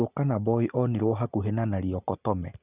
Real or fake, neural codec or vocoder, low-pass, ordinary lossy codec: real; none; 3.6 kHz; none